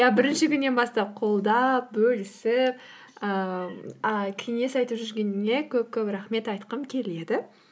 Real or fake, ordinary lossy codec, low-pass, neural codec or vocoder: real; none; none; none